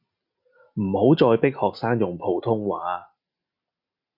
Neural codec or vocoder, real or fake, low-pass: none; real; 5.4 kHz